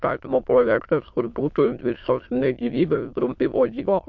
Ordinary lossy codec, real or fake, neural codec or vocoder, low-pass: MP3, 48 kbps; fake; autoencoder, 22.05 kHz, a latent of 192 numbers a frame, VITS, trained on many speakers; 7.2 kHz